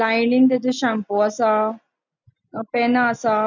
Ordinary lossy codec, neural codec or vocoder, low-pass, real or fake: none; none; 7.2 kHz; real